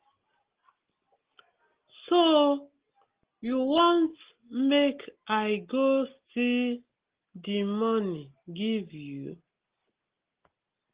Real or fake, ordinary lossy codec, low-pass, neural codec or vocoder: real; Opus, 16 kbps; 3.6 kHz; none